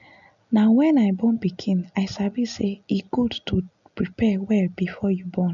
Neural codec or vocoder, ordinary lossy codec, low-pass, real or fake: none; none; 7.2 kHz; real